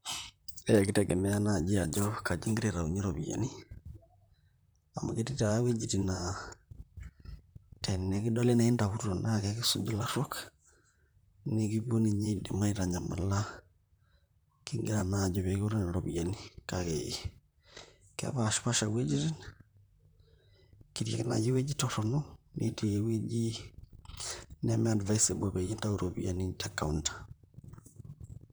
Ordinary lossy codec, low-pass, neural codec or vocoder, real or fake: none; none; vocoder, 44.1 kHz, 128 mel bands, Pupu-Vocoder; fake